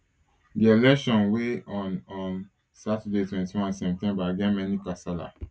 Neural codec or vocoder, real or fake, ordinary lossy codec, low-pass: none; real; none; none